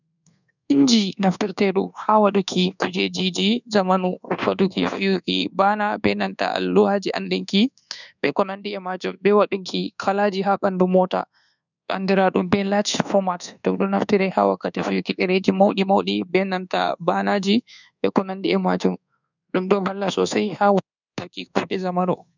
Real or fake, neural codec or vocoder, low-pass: fake; codec, 24 kHz, 1.2 kbps, DualCodec; 7.2 kHz